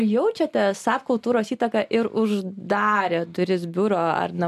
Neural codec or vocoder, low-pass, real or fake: none; 14.4 kHz; real